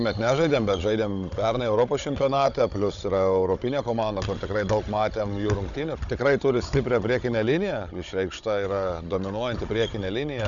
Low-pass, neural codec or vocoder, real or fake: 7.2 kHz; codec, 16 kHz, 16 kbps, FunCodec, trained on Chinese and English, 50 frames a second; fake